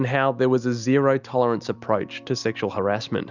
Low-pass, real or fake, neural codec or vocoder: 7.2 kHz; real; none